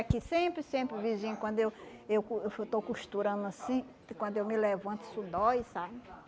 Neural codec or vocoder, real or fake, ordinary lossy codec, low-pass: none; real; none; none